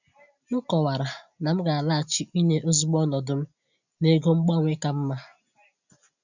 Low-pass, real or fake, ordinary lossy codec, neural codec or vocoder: 7.2 kHz; real; none; none